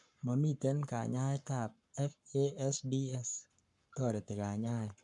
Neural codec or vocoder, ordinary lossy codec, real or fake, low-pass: codec, 44.1 kHz, 7.8 kbps, Pupu-Codec; none; fake; 10.8 kHz